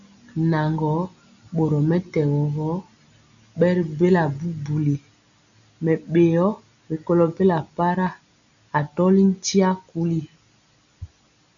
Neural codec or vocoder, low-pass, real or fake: none; 7.2 kHz; real